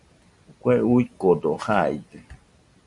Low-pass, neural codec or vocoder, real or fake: 10.8 kHz; none; real